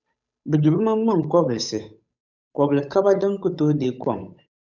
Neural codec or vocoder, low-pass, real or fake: codec, 16 kHz, 8 kbps, FunCodec, trained on Chinese and English, 25 frames a second; 7.2 kHz; fake